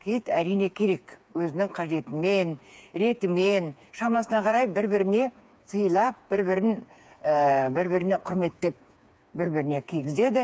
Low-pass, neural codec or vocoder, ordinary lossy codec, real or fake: none; codec, 16 kHz, 4 kbps, FreqCodec, smaller model; none; fake